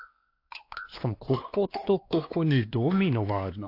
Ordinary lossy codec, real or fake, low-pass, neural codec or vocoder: AAC, 32 kbps; fake; 5.4 kHz; codec, 16 kHz, 2 kbps, X-Codec, WavLM features, trained on Multilingual LibriSpeech